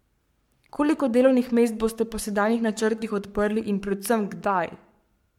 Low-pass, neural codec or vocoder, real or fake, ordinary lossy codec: 19.8 kHz; codec, 44.1 kHz, 7.8 kbps, Pupu-Codec; fake; MP3, 96 kbps